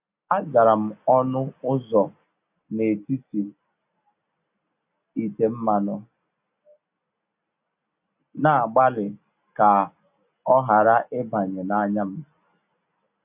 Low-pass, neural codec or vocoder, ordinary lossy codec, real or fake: 3.6 kHz; none; AAC, 32 kbps; real